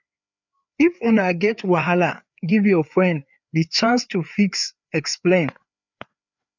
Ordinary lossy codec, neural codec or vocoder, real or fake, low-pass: none; codec, 16 kHz, 4 kbps, FreqCodec, larger model; fake; 7.2 kHz